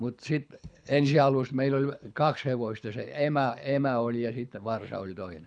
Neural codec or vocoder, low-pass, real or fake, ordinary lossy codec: codec, 24 kHz, 6 kbps, HILCodec; 9.9 kHz; fake; none